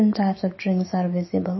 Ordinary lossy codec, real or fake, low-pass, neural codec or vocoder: MP3, 24 kbps; fake; 7.2 kHz; autoencoder, 48 kHz, 128 numbers a frame, DAC-VAE, trained on Japanese speech